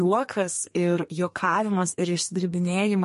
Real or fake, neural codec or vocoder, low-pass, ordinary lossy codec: fake; codec, 32 kHz, 1.9 kbps, SNAC; 14.4 kHz; MP3, 48 kbps